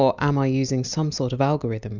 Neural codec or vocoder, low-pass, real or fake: none; 7.2 kHz; real